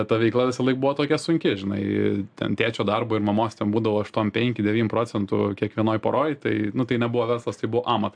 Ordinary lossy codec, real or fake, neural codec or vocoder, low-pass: AAC, 64 kbps; real; none; 9.9 kHz